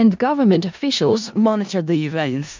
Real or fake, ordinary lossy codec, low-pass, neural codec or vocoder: fake; MP3, 64 kbps; 7.2 kHz; codec, 16 kHz in and 24 kHz out, 0.4 kbps, LongCat-Audio-Codec, four codebook decoder